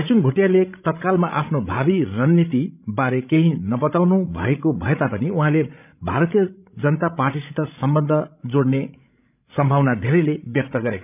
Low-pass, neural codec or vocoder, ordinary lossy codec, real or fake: 3.6 kHz; codec, 16 kHz, 16 kbps, FreqCodec, larger model; none; fake